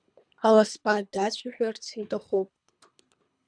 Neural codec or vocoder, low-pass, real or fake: codec, 24 kHz, 3 kbps, HILCodec; 9.9 kHz; fake